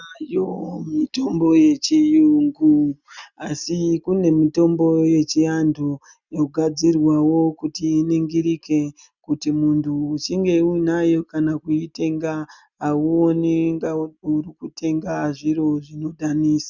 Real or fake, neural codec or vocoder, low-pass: real; none; 7.2 kHz